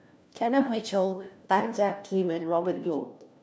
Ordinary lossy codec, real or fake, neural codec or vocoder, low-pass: none; fake; codec, 16 kHz, 1 kbps, FunCodec, trained on LibriTTS, 50 frames a second; none